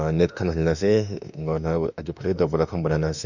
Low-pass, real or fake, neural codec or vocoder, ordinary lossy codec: 7.2 kHz; fake; autoencoder, 48 kHz, 32 numbers a frame, DAC-VAE, trained on Japanese speech; none